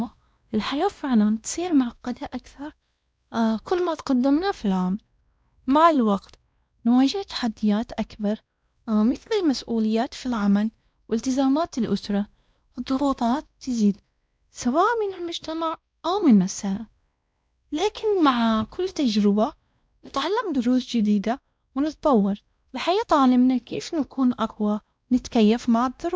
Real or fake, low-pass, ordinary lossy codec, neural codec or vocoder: fake; none; none; codec, 16 kHz, 1 kbps, X-Codec, WavLM features, trained on Multilingual LibriSpeech